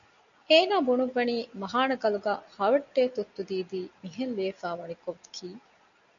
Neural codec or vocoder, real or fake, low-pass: none; real; 7.2 kHz